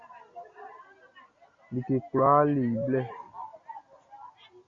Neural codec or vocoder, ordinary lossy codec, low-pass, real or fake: none; Opus, 64 kbps; 7.2 kHz; real